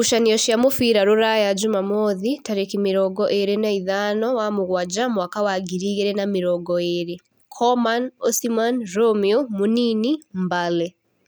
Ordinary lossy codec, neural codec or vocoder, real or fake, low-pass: none; none; real; none